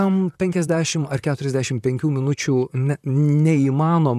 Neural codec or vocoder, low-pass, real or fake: vocoder, 44.1 kHz, 128 mel bands, Pupu-Vocoder; 14.4 kHz; fake